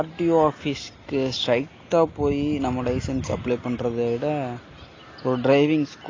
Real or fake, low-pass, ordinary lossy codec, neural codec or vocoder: real; 7.2 kHz; AAC, 32 kbps; none